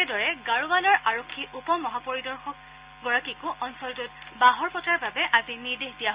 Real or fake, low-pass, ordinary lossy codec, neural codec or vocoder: real; 3.6 kHz; Opus, 64 kbps; none